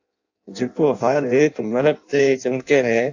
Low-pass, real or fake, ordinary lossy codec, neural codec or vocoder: 7.2 kHz; fake; AAC, 48 kbps; codec, 16 kHz in and 24 kHz out, 0.6 kbps, FireRedTTS-2 codec